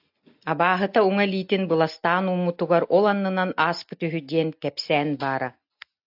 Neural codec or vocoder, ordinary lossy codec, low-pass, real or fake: none; AAC, 48 kbps; 5.4 kHz; real